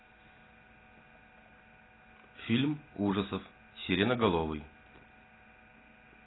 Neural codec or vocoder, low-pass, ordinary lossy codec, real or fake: none; 7.2 kHz; AAC, 16 kbps; real